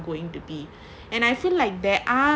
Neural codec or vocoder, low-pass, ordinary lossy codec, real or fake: none; none; none; real